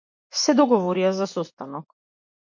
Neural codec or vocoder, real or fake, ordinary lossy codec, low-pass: vocoder, 24 kHz, 100 mel bands, Vocos; fake; MP3, 48 kbps; 7.2 kHz